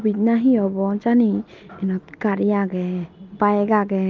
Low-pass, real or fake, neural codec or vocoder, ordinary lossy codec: 7.2 kHz; real; none; Opus, 32 kbps